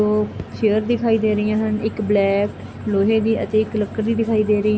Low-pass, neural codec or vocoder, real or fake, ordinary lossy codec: none; none; real; none